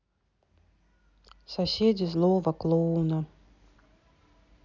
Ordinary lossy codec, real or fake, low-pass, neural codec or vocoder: none; real; 7.2 kHz; none